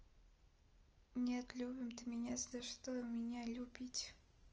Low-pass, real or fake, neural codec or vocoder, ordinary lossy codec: 7.2 kHz; real; none; Opus, 24 kbps